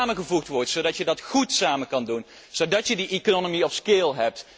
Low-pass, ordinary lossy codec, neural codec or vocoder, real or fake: none; none; none; real